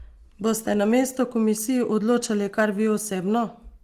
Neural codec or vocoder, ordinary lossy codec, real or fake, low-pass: none; Opus, 24 kbps; real; 14.4 kHz